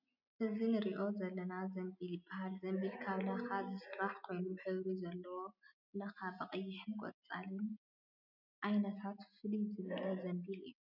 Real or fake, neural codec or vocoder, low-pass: real; none; 5.4 kHz